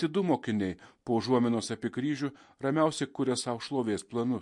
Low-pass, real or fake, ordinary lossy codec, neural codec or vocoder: 10.8 kHz; real; MP3, 48 kbps; none